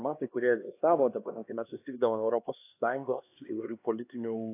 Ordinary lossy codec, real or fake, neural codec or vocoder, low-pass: AAC, 24 kbps; fake; codec, 16 kHz, 2 kbps, X-Codec, HuBERT features, trained on LibriSpeech; 3.6 kHz